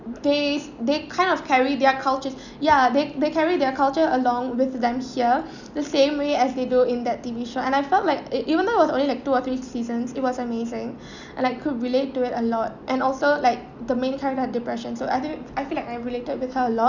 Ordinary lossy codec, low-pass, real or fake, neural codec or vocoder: none; 7.2 kHz; real; none